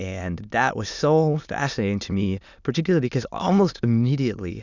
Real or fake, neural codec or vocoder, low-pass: fake; autoencoder, 22.05 kHz, a latent of 192 numbers a frame, VITS, trained on many speakers; 7.2 kHz